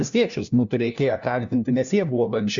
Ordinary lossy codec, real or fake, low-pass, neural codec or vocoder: Opus, 64 kbps; fake; 7.2 kHz; codec, 16 kHz, 1 kbps, FunCodec, trained on LibriTTS, 50 frames a second